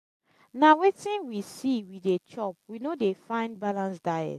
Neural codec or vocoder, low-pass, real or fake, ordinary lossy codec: none; 14.4 kHz; real; AAC, 64 kbps